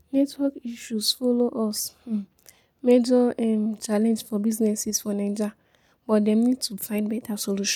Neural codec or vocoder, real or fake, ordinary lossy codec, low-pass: none; real; none; 19.8 kHz